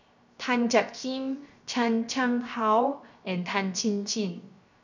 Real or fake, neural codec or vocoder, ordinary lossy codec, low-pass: fake; codec, 16 kHz, 0.7 kbps, FocalCodec; none; 7.2 kHz